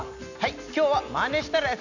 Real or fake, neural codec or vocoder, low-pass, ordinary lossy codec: real; none; 7.2 kHz; none